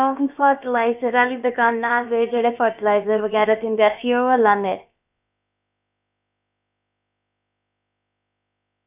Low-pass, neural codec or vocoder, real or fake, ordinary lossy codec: 3.6 kHz; codec, 16 kHz, about 1 kbps, DyCAST, with the encoder's durations; fake; none